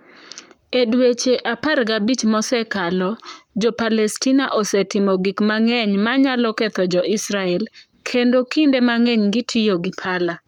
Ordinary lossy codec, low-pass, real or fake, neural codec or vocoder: none; 19.8 kHz; fake; codec, 44.1 kHz, 7.8 kbps, Pupu-Codec